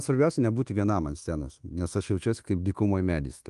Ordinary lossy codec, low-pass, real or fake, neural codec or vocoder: Opus, 24 kbps; 10.8 kHz; fake; codec, 24 kHz, 1.2 kbps, DualCodec